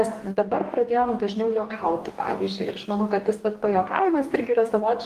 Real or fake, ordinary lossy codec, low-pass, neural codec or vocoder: fake; Opus, 24 kbps; 14.4 kHz; codec, 44.1 kHz, 2.6 kbps, DAC